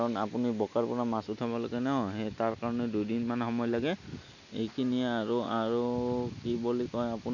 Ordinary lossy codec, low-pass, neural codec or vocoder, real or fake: none; 7.2 kHz; none; real